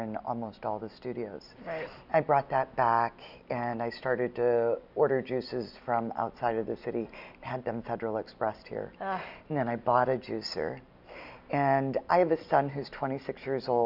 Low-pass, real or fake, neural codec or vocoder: 5.4 kHz; real; none